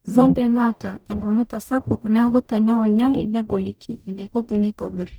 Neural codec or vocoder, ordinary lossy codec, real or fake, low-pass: codec, 44.1 kHz, 0.9 kbps, DAC; none; fake; none